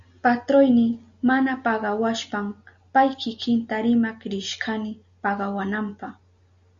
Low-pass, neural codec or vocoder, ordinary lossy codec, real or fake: 7.2 kHz; none; Opus, 64 kbps; real